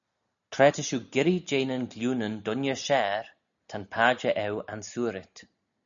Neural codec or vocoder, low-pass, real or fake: none; 7.2 kHz; real